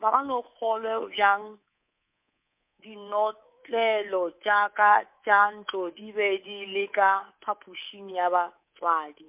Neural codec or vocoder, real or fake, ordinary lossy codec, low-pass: codec, 24 kHz, 3.1 kbps, DualCodec; fake; MP3, 32 kbps; 3.6 kHz